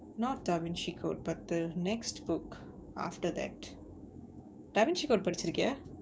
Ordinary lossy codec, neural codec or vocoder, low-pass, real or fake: none; codec, 16 kHz, 6 kbps, DAC; none; fake